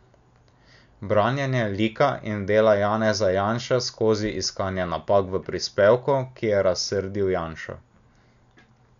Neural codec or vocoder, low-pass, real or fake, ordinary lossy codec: none; 7.2 kHz; real; none